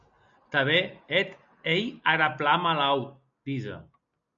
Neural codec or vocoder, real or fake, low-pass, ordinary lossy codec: none; real; 7.2 kHz; MP3, 96 kbps